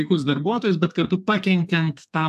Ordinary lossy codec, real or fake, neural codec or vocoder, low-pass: AAC, 96 kbps; fake; codec, 32 kHz, 1.9 kbps, SNAC; 14.4 kHz